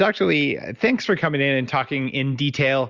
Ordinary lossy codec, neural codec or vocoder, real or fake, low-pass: Opus, 64 kbps; none; real; 7.2 kHz